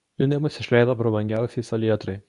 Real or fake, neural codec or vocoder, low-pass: fake; codec, 24 kHz, 0.9 kbps, WavTokenizer, medium speech release version 2; 10.8 kHz